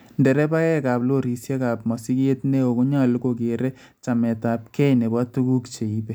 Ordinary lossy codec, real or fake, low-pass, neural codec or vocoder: none; real; none; none